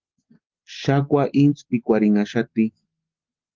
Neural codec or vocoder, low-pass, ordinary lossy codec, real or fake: none; 7.2 kHz; Opus, 16 kbps; real